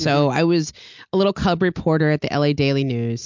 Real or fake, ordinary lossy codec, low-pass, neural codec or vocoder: real; MP3, 64 kbps; 7.2 kHz; none